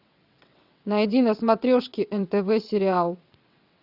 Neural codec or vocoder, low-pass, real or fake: vocoder, 22.05 kHz, 80 mel bands, WaveNeXt; 5.4 kHz; fake